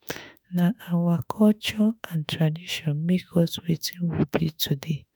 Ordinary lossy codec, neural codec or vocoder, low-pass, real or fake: none; autoencoder, 48 kHz, 32 numbers a frame, DAC-VAE, trained on Japanese speech; none; fake